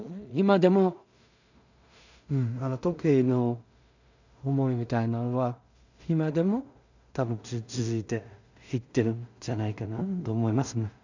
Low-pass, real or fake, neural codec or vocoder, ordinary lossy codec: 7.2 kHz; fake; codec, 16 kHz in and 24 kHz out, 0.4 kbps, LongCat-Audio-Codec, two codebook decoder; none